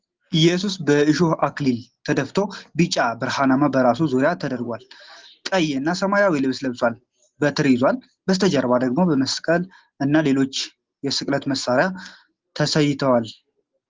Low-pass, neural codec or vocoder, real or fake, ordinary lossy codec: 7.2 kHz; none; real; Opus, 16 kbps